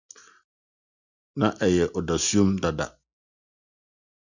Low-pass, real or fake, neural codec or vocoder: 7.2 kHz; real; none